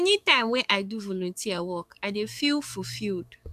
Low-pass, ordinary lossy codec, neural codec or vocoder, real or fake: 14.4 kHz; AAC, 96 kbps; codec, 44.1 kHz, 7.8 kbps, DAC; fake